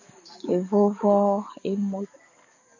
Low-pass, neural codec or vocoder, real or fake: 7.2 kHz; codec, 44.1 kHz, 7.8 kbps, DAC; fake